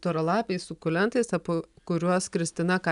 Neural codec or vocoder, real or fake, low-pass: none; real; 10.8 kHz